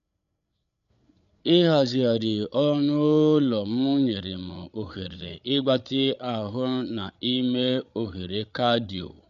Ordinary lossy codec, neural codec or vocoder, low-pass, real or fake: none; codec, 16 kHz, 8 kbps, FreqCodec, larger model; 7.2 kHz; fake